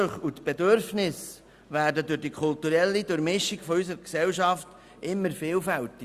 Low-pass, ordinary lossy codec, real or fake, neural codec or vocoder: 14.4 kHz; Opus, 64 kbps; real; none